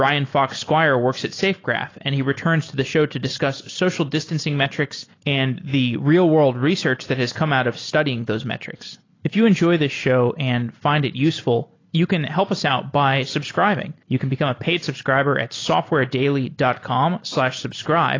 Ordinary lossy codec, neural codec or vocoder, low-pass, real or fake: AAC, 32 kbps; none; 7.2 kHz; real